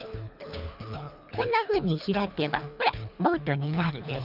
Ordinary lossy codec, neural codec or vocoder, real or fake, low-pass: none; codec, 24 kHz, 3 kbps, HILCodec; fake; 5.4 kHz